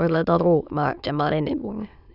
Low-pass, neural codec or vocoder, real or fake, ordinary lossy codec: 5.4 kHz; autoencoder, 22.05 kHz, a latent of 192 numbers a frame, VITS, trained on many speakers; fake; none